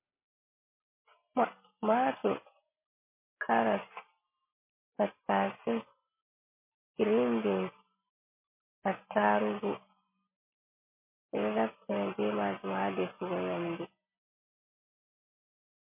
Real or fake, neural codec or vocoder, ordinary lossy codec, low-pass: real; none; MP3, 24 kbps; 3.6 kHz